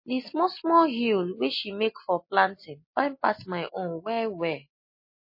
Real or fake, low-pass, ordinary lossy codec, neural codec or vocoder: real; 5.4 kHz; MP3, 24 kbps; none